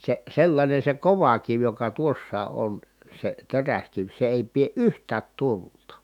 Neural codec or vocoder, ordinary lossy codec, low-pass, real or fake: autoencoder, 48 kHz, 128 numbers a frame, DAC-VAE, trained on Japanese speech; none; 19.8 kHz; fake